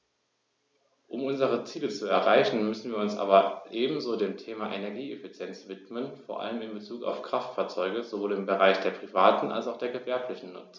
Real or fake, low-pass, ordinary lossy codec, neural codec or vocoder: real; none; none; none